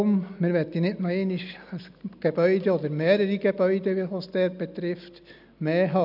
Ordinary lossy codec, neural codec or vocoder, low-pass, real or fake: none; none; 5.4 kHz; real